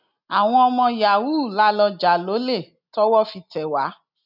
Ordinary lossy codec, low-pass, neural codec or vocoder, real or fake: none; 5.4 kHz; none; real